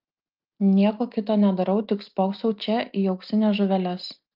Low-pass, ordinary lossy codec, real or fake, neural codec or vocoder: 5.4 kHz; Opus, 32 kbps; real; none